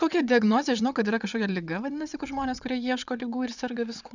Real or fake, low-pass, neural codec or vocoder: fake; 7.2 kHz; vocoder, 22.05 kHz, 80 mel bands, WaveNeXt